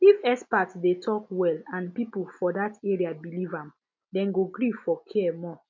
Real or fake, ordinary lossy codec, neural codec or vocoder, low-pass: real; MP3, 48 kbps; none; 7.2 kHz